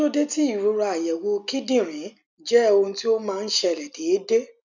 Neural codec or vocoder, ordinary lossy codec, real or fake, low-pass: none; none; real; 7.2 kHz